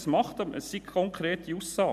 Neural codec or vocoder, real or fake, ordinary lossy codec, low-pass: none; real; none; 14.4 kHz